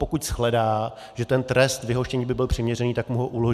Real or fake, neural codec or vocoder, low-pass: real; none; 14.4 kHz